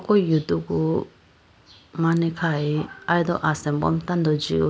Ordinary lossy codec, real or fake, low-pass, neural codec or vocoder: none; real; none; none